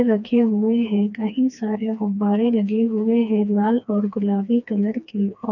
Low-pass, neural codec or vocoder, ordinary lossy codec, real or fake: 7.2 kHz; codec, 16 kHz, 2 kbps, FreqCodec, smaller model; none; fake